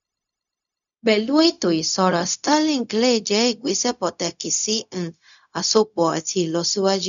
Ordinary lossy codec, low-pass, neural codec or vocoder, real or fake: none; 7.2 kHz; codec, 16 kHz, 0.4 kbps, LongCat-Audio-Codec; fake